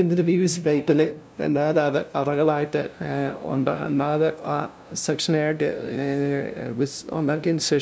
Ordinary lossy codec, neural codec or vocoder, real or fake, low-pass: none; codec, 16 kHz, 0.5 kbps, FunCodec, trained on LibriTTS, 25 frames a second; fake; none